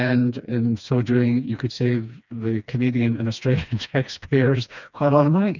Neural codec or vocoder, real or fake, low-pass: codec, 16 kHz, 2 kbps, FreqCodec, smaller model; fake; 7.2 kHz